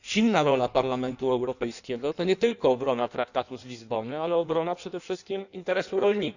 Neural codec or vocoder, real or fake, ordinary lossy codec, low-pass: codec, 16 kHz in and 24 kHz out, 1.1 kbps, FireRedTTS-2 codec; fake; none; 7.2 kHz